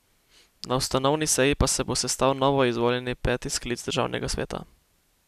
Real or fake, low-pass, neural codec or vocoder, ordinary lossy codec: real; 14.4 kHz; none; none